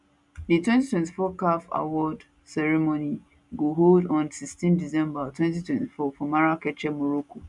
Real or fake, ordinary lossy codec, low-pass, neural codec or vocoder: real; none; 10.8 kHz; none